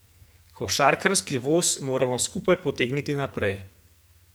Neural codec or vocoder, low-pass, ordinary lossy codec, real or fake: codec, 44.1 kHz, 2.6 kbps, SNAC; none; none; fake